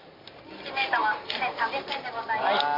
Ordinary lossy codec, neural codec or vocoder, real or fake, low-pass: MP3, 32 kbps; none; real; 5.4 kHz